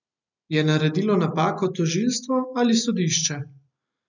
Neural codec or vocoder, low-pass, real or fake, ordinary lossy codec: none; 7.2 kHz; real; none